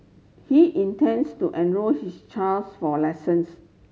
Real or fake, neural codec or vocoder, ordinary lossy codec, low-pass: real; none; none; none